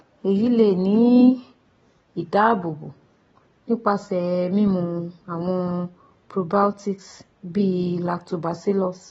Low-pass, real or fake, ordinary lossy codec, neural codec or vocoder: 19.8 kHz; real; AAC, 24 kbps; none